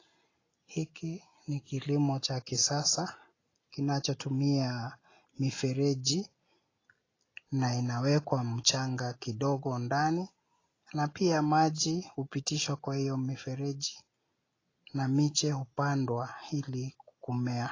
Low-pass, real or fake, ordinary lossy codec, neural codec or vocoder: 7.2 kHz; real; AAC, 32 kbps; none